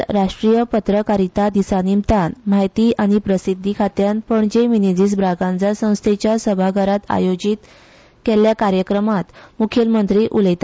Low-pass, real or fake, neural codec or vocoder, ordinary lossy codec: none; real; none; none